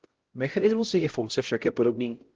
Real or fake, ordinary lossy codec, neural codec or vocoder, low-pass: fake; Opus, 16 kbps; codec, 16 kHz, 0.5 kbps, X-Codec, HuBERT features, trained on LibriSpeech; 7.2 kHz